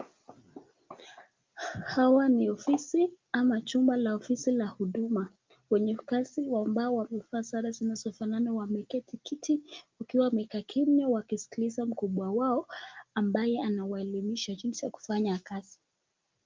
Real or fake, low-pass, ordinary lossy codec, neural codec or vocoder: real; 7.2 kHz; Opus, 24 kbps; none